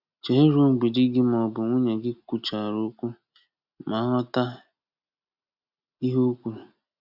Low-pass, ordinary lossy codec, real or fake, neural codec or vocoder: 5.4 kHz; none; real; none